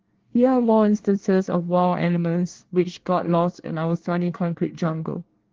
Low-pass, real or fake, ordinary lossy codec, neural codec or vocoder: 7.2 kHz; fake; Opus, 16 kbps; codec, 24 kHz, 1 kbps, SNAC